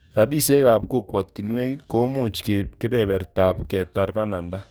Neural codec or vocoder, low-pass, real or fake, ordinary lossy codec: codec, 44.1 kHz, 2.6 kbps, DAC; none; fake; none